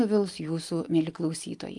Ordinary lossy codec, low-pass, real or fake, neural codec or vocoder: Opus, 32 kbps; 10.8 kHz; real; none